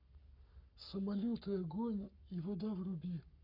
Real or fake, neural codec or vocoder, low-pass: fake; codec, 44.1 kHz, 7.8 kbps, Pupu-Codec; 5.4 kHz